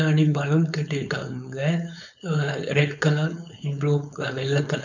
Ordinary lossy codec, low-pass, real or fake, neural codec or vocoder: none; 7.2 kHz; fake; codec, 16 kHz, 4.8 kbps, FACodec